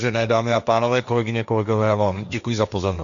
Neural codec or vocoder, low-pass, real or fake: codec, 16 kHz, 1.1 kbps, Voila-Tokenizer; 7.2 kHz; fake